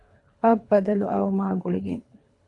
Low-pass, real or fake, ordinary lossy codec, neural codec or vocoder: 10.8 kHz; fake; AAC, 48 kbps; codec, 24 kHz, 3 kbps, HILCodec